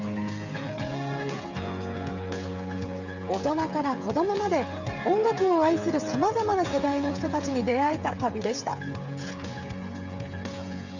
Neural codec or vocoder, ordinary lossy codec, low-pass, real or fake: codec, 16 kHz, 8 kbps, FreqCodec, smaller model; none; 7.2 kHz; fake